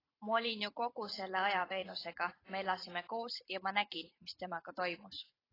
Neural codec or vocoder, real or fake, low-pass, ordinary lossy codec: vocoder, 44.1 kHz, 128 mel bands every 512 samples, BigVGAN v2; fake; 5.4 kHz; AAC, 24 kbps